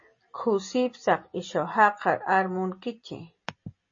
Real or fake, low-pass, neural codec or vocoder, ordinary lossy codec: real; 7.2 kHz; none; MP3, 32 kbps